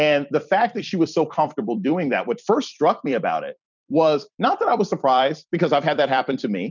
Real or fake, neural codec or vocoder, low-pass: fake; vocoder, 44.1 kHz, 128 mel bands every 256 samples, BigVGAN v2; 7.2 kHz